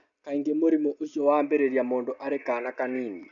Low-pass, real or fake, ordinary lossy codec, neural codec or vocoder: 7.2 kHz; real; none; none